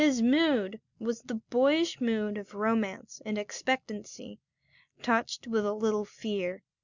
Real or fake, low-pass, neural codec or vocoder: real; 7.2 kHz; none